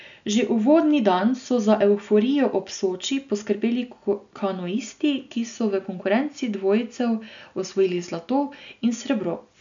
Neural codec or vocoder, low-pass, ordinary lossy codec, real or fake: none; 7.2 kHz; none; real